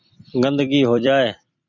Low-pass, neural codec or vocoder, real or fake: 7.2 kHz; vocoder, 44.1 kHz, 128 mel bands every 256 samples, BigVGAN v2; fake